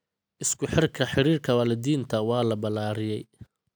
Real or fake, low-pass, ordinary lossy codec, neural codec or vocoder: real; none; none; none